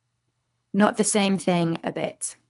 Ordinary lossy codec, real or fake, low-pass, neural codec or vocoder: none; fake; 10.8 kHz; codec, 24 kHz, 3 kbps, HILCodec